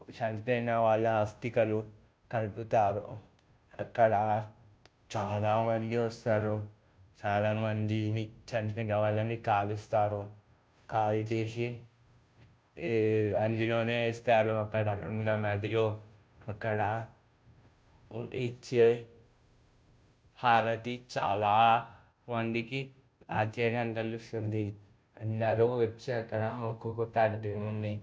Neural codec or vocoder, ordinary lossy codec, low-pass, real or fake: codec, 16 kHz, 0.5 kbps, FunCodec, trained on Chinese and English, 25 frames a second; none; none; fake